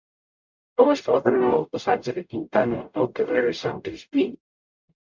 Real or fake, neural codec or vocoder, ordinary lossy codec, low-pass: fake; codec, 44.1 kHz, 0.9 kbps, DAC; MP3, 64 kbps; 7.2 kHz